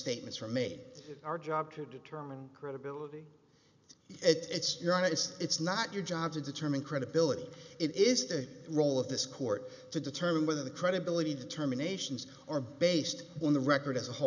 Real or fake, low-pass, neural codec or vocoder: real; 7.2 kHz; none